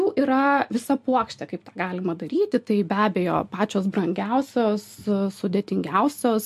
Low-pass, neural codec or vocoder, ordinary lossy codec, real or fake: 14.4 kHz; none; MP3, 64 kbps; real